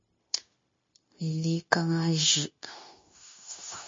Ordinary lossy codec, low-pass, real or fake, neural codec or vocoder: MP3, 32 kbps; 7.2 kHz; fake; codec, 16 kHz, 0.9 kbps, LongCat-Audio-Codec